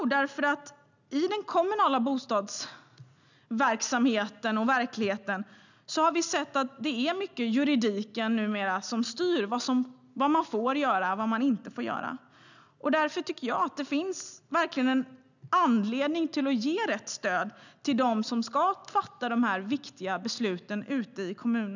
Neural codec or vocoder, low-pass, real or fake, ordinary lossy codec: none; 7.2 kHz; real; none